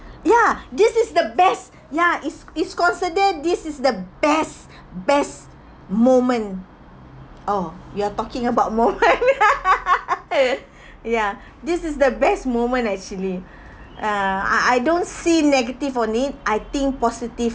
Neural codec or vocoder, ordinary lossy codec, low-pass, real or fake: none; none; none; real